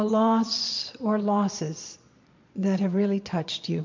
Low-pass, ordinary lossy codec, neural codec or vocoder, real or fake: 7.2 kHz; MP3, 48 kbps; vocoder, 22.05 kHz, 80 mel bands, Vocos; fake